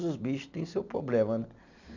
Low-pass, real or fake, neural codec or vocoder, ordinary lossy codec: 7.2 kHz; real; none; none